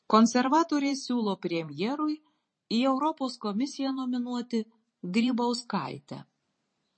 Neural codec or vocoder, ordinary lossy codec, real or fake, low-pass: vocoder, 44.1 kHz, 128 mel bands every 512 samples, BigVGAN v2; MP3, 32 kbps; fake; 9.9 kHz